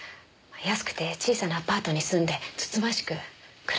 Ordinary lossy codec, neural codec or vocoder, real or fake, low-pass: none; none; real; none